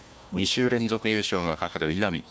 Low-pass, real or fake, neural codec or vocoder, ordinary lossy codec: none; fake; codec, 16 kHz, 1 kbps, FunCodec, trained on Chinese and English, 50 frames a second; none